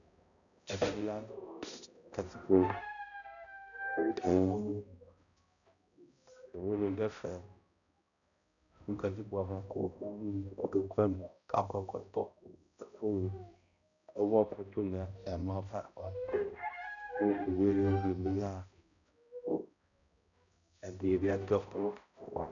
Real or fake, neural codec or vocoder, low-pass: fake; codec, 16 kHz, 0.5 kbps, X-Codec, HuBERT features, trained on balanced general audio; 7.2 kHz